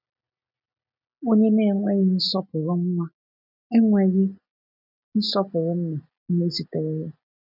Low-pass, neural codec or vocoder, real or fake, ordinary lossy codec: 5.4 kHz; none; real; none